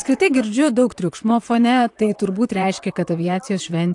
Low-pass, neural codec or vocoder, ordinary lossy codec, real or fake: 10.8 kHz; vocoder, 44.1 kHz, 128 mel bands, Pupu-Vocoder; AAC, 64 kbps; fake